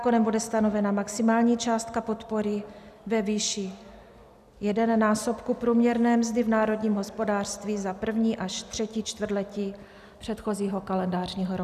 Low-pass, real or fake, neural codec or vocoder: 14.4 kHz; real; none